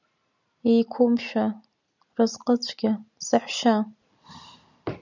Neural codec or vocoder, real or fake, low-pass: none; real; 7.2 kHz